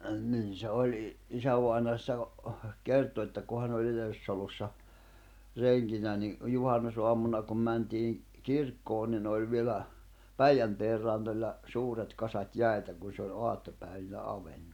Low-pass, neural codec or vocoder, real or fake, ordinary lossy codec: 19.8 kHz; none; real; none